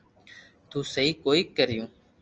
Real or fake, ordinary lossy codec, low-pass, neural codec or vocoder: real; Opus, 24 kbps; 7.2 kHz; none